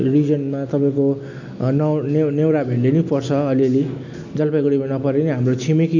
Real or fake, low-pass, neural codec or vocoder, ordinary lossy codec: real; 7.2 kHz; none; none